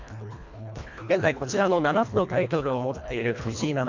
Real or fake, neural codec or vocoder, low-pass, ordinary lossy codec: fake; codec, 24 kHz, 1.5 kbps, HILCodec; 7.2 kHz; MP3, 64 kbps